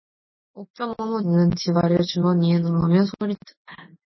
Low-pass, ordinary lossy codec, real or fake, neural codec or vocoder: 7.2 kHz; MP3, 24 kbps; real; none